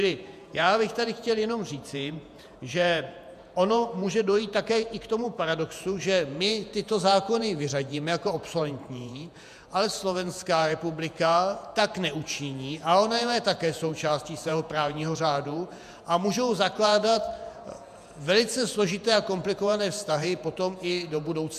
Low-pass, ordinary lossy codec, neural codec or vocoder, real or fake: 14.4 kHz; MP3, 96 kbps; vocoder, 48 kHz, 128 mel bands, Vocos; fake